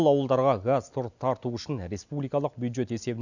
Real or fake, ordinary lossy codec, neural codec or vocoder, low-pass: real; none; none; 7.2 kHz